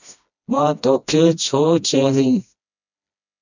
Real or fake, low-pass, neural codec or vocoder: fake; 7.2 kHz; codec, 16 kHz, 1 kbps, FreqCodec, smaller model